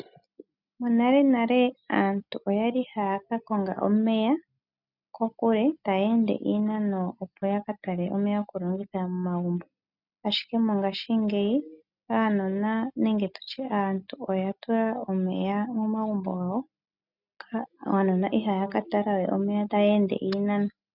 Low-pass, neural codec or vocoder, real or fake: 5.4 kHz; none; real